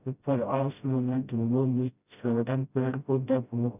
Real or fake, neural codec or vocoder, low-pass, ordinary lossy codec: fake; codec, 16 kHz, 0.5 kbps, FreqCodec, smaller model; 3.6 kHz; none